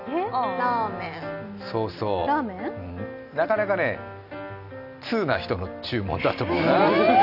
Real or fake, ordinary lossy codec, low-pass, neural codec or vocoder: real; none; 5.4 kHz; none